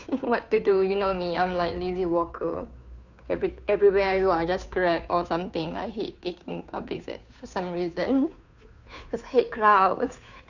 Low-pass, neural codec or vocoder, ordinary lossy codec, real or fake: 7.2 kHz; codec, 16 kHz, 2 kbps, FunCodec, trained on Chinese and English, 25 frames a second; none; fake